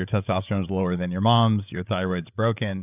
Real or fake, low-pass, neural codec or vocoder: fake; 3.6 kHz; codec, 16 kHz, 8 kbps, FreqCodec, larger model